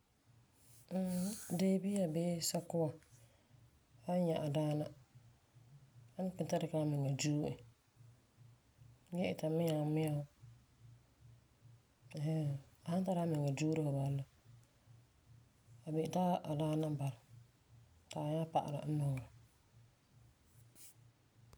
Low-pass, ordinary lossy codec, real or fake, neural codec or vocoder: none; none; real; none